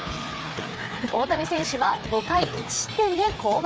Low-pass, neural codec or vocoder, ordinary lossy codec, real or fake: none; codec, 16 kHz, 4 kbps, FreqCodec, larger model; none; fake